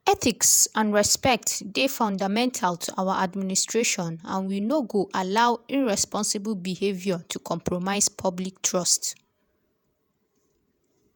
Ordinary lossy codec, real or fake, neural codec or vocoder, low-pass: none; fake; vocoder, 48 kHz, 128 mel bands, Vocos; none